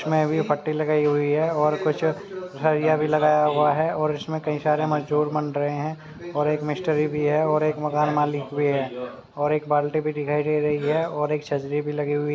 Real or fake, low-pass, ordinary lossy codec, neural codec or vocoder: real; none; none; none